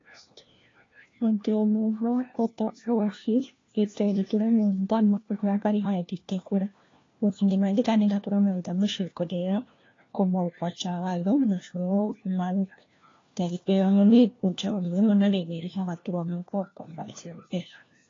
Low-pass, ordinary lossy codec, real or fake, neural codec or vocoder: 7.2 kHz; AAC, 32 kbps; fake; codec, 16 kHz, 1 kbps, FunCodec, trained on LibriTTS, 50 frames a second